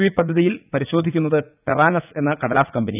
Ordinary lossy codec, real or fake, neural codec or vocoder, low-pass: none; fake; vocoder, 44.1 kHz, 80 mel bands, Vocos; 3.6 kHz